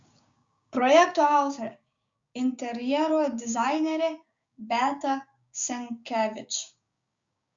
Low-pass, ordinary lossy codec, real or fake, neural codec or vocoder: 7.2 kHz; Opus, 64 kbps; fake; codec, 16 kHz, 6 kbps, DAC